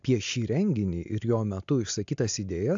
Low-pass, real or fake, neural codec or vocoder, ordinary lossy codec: 7.2 kHz; real; none; AAC, 64 kbps